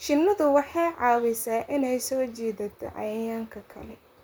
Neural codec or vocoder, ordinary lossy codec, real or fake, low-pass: vocoder, 44.1 kHz, 128 mel bands, Pupu-Vocoder; none; fake; none